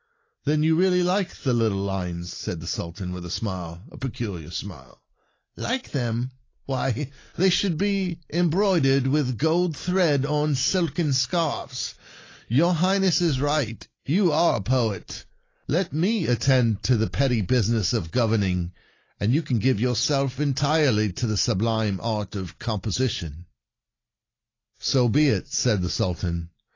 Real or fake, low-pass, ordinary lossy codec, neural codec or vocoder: real; 7.2 kHz; AAC, 32 kbps; none